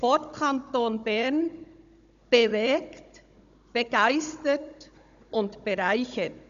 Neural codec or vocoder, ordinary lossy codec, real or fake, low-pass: codec, 16 kHz, 16 kbps, FunCodec, trained on Chinese and English, 50 frames a second; AAC, 96 kbps; fake; 7.2 kHz